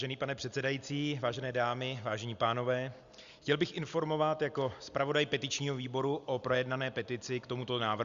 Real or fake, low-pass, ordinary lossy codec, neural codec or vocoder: real; 7.2 kHz; Opus, 64 kbps; none